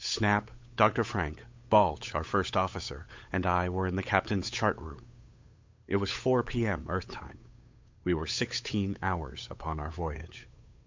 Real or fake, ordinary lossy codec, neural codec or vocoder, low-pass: fake; MP3, 64 kbps; codec, 16 kHz, 8 kbps, FunCodec, trained on Chinese and English, 25 frames a second; 7.2 kHz